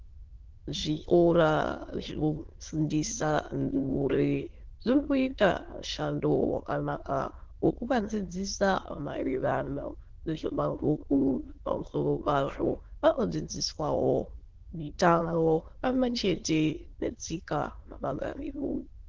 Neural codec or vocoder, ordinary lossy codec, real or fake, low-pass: autoencoder, 22.05 kHz, a latent of 192 numbers a frame, VITS, trained on many speakers; Opus, 16 kbps; fake; 7.2 kHz